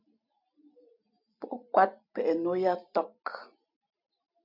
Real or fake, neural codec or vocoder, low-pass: fake; vocoder, 44.1 kHz, 128 mel bands every 256 samples, BigVGAN v2; 5.4 kHz